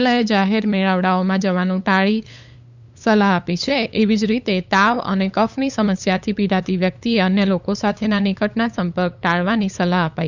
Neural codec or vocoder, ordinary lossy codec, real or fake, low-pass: codec, 16 kHz, 8 kbps, FunCodec, trained on LibriTTS, 25 frames a second; none; fake; 7.2 kHz